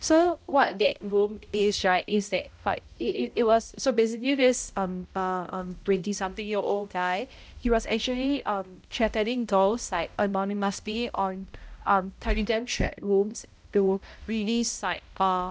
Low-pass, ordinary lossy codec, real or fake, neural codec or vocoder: none; none; fake; codec, 16 kHz, 0.5 kbps, X-Codec, HuBERT features, trained on balanced general audio